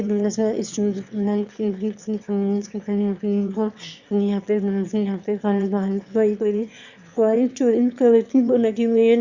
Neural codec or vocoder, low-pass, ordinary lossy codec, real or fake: autoencoder, 22.05 kHz, a latent of 192 numbers a frame, VITS, trained on one speaker; 7.2 kHz; Opus, 64 kbps; fake